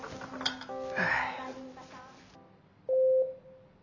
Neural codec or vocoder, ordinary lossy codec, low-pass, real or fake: none; none; 7.2 kHz; real